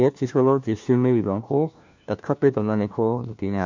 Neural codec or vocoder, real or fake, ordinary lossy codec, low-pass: codec, 16 kHz, 1 kbps, FunCodec, trained on LibriTTS, 50 frames a second; fake; MP3, 64 kbps; 7.2 kHz